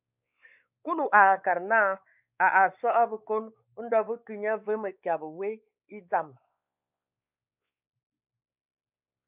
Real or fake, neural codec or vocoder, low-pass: fake; codec, 16 kHz, 4 kbps, X-Codec, WavLM features, trained on Multilingual LibriSpeech; 3.6 kHz